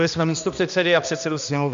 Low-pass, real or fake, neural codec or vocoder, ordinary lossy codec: 7.2 kHz; fake; codec, 16 kHz, 1 kbps, X-Codec, HuBERT features, trained on balanced general audio; MP3, 64 kbps